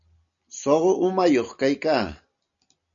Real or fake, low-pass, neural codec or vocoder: real; 7.2 kHz; none